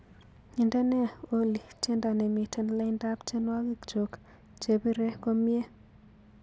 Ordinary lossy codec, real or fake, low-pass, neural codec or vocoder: none; real; none; none